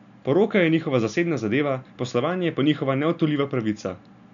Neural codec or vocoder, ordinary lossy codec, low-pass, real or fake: none; none; 7.2 kHz; real